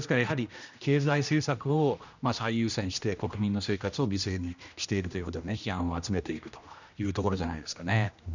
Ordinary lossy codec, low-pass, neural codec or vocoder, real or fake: none; 7.2 kHz; codec, 16 kHz, 1 kbps, X-Codec, HuBERT features, trained on general audio; fake